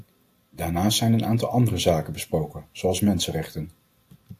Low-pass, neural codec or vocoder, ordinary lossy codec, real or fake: 14.4 kHz; none; AAC, 64 kbps; real